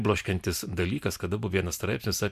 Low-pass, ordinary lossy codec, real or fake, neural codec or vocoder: 14.4 kHz; MP3, 96 kbps; real; none